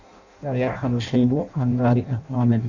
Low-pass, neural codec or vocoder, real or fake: 7.2 kHz; codec, 16 kHz in and 24 kHz out, 0.6 kbps, FireRedTTS-2 codec; fake